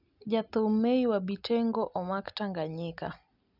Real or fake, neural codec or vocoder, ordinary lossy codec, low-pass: real; none; none; 5.4 kHz